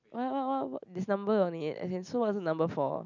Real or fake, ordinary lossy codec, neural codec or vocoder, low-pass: real; none; none; 7.2 kHz